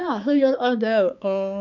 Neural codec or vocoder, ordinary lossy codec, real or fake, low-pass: codec, 16 kHz, 4 kbps, X-Codec, HuBERT features, trained on balanced general audio; none; fake; 7.2 kHz